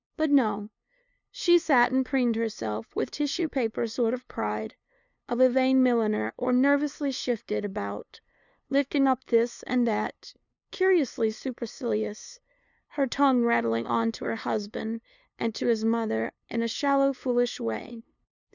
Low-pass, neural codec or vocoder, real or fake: 7.2 kHz; codec, 16 kHz, 2 kbps, FunCodec, trained on LibriTTS, 25 frames a second; fake